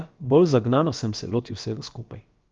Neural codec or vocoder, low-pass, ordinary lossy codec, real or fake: codec, 16 kHz, about 1 kbps, DyCAST, with the encoder's durations; 7.2 kHz; Opus, 32 kbps; fake